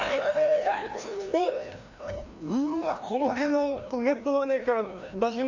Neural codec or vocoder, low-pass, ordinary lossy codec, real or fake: codec, 16 kHz, 1 kbps, FreqCodec, larger model; 7.2 kHz; none; fake